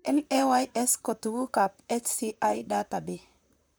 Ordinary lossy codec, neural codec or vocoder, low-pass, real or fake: none; vocoder, 44.1 kHz, 128 mel bands, Pupu-Vocoder; none; fake